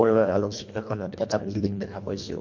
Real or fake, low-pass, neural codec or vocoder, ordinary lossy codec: fake; 7.2 kHz; codec, 24 kHz, 1.5 kbps, HILCodec; MP3, 48 kbps